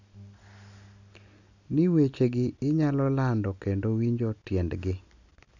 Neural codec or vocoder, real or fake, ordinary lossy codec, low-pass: none; real; none; 7.2 kHz